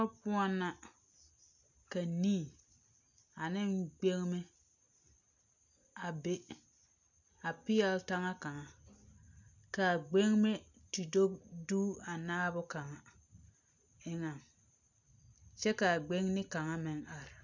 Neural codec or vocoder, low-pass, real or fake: none; 7.2 kHz; real